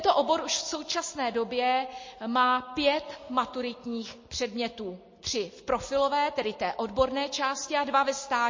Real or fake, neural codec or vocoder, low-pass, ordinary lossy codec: real; none; 7.2 kHz; MP3, 32 kbps